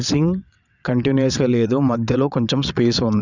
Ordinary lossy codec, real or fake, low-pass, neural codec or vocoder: none; fake; 7.2 kHz; vocoder, 22.05 kHz, 80 mel bands, WaveNeXt